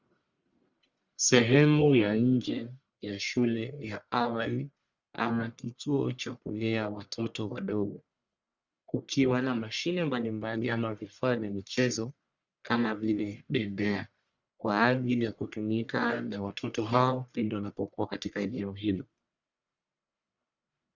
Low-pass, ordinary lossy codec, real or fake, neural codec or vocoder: 7.2 kHz; Opus, 64 kbps; fake; codec, 44.1 kHz, 1.7 kbps, Pupu-Codec